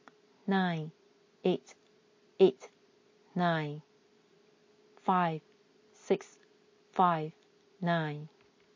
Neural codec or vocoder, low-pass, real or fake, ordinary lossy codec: none; 7.2 kHz; real; MP3, 32 kbps